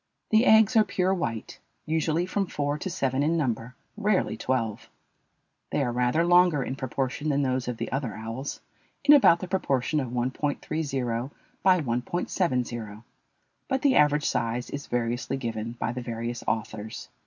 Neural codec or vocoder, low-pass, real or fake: none; 7.2 kHz; real